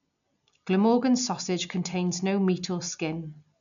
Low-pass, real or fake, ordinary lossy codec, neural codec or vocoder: 7.2 kHz; real; none; none